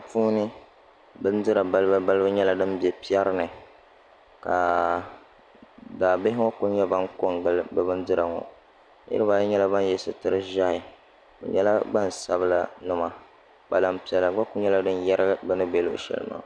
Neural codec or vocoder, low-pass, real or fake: none; 9.9 kHz; real